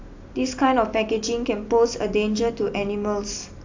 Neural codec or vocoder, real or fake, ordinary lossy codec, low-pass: none; real; AAC, 48 kbps; 7.2 kHz